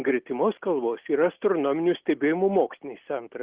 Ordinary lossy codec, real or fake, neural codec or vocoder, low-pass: Opus, 16 kbps; real; none; 3.6 kHz